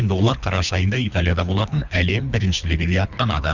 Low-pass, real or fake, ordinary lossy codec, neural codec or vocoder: 7.2 kHz; fake; none; codec, 24 kHz, 3 kbps, HILCodec